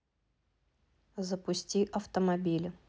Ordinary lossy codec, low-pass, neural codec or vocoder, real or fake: none; none; none; real